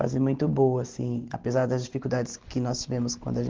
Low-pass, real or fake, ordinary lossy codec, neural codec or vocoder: 7.2 kHz; real; Opus, 16 kbps; none